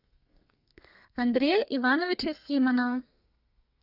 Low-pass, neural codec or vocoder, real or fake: 5.4 kHz; codec, 32 kHz, 1.9 kbps, SNAC; fake